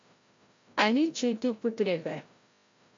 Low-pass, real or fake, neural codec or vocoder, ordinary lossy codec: 7.2 kHz; fake; codec, 16 kHz, 0.5 kbps, FreqCodec, larger model; MP3, 48 kbps